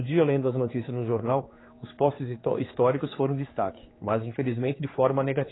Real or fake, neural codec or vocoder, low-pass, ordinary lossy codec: fake; codec, 16 kHz, 4 kbps, X-Codec, HuBERT features, trained on general audio; 7.2 kHz; AAC, 16 kbps